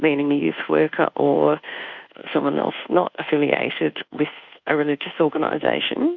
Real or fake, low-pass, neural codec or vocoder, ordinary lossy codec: fake; 7.2 kHz; codec, 24 kHz, 1.2 kbps, DualCodec; Opus, 64 kbps